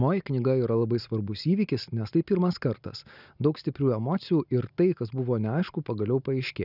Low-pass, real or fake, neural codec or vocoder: 5.4 kHz; real; none